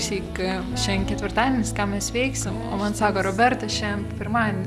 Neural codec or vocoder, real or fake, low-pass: none; real; 14.4 kHz